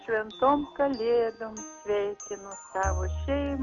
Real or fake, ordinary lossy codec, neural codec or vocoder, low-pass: real; MP3, 64 kbps; none; 7.2 kHz